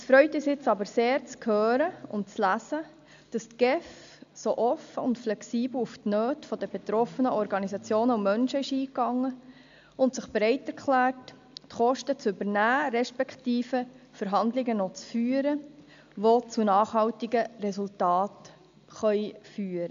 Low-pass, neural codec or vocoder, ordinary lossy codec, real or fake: 7.2 kHz; none; AAC, 64 kbps; real